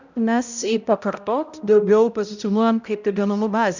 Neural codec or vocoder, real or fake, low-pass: codec, 16 kHz, 0.5 kbps, X-Codec, HuBERT features, trained on balanced general audio; fake; 7.2 kHz